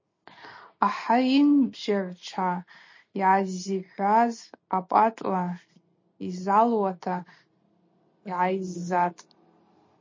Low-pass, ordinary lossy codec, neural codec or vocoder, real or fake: 7.2 kHz; MP3, 32 kbps; none; real